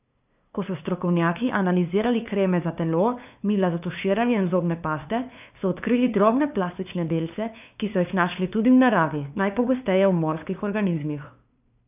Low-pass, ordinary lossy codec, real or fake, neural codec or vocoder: 3.6 kHz; none; fake; codec, 16 kHz, 2 kbps, FunCodec, trained on LibriTTS, 25 frames a second